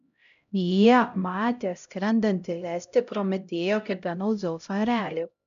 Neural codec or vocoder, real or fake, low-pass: codec, 16 kHz, 0.5 kbps, X-Codec, HuBERT features, trained on LibriSpeech; fake; 7.2 kHz